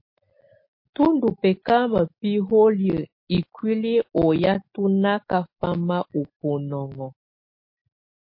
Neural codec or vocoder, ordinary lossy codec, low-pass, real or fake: none; MP3, 32 kbps; 5.4 kHz; real